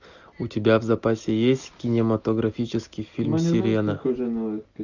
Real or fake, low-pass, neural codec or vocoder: real; 7.2 kHz; none